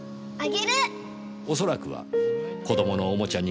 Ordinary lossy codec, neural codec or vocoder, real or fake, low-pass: none; none; real; none